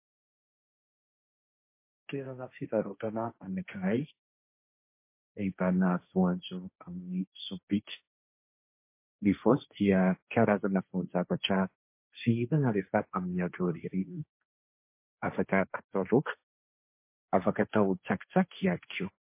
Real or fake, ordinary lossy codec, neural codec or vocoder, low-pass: fake; MP3, 24 kbps; codec, 16 kHz, 1.1 kbps, Voila-Tokenizer; 3.6 kHz